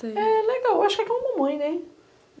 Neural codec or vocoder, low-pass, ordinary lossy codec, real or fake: none; none; none; real